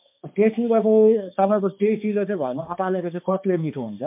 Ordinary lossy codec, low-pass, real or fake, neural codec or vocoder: MP3, 32 kbps; 3.6 kHz; fake; codec, 16 kHz, 2 kbps, X-Codec, HuBERT features, trained on balanced general audio